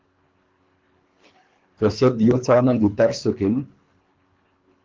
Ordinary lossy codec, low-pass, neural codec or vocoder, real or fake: Opus, 24 kbps; 7.2 kHz; codec, 24 kHz, 3 kbps, HILCodec; fake